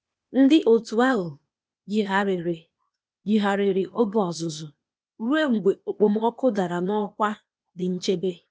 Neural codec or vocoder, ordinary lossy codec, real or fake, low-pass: codec, 16 kHz, 0.8 kbps, ZipCodec; none; fake; none